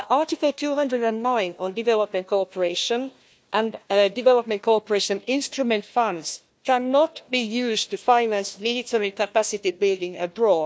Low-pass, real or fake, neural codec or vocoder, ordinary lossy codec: none; fake; codec, 16 kHz, 1 kbps, FunCodec, trained on Chinese and English, 50 frames a second; none